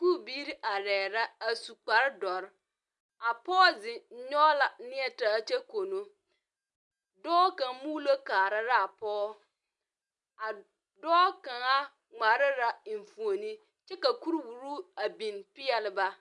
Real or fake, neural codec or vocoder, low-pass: real; none; 10.8 kHz